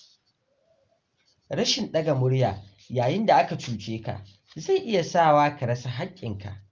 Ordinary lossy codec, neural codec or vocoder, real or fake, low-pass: Opus, 32 kbps; none; real; 7.2 kHz